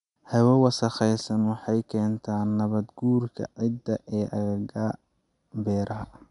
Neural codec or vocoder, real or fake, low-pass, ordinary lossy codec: none; real; 10.8 kHz; none